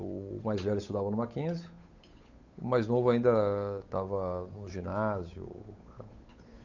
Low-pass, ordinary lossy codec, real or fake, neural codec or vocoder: 7.2 kHz; Opus, 64 kbps; fake; codec, 16 kHz, 8 kbps, FunCodec, trained on Chinese and English, 25 frames a second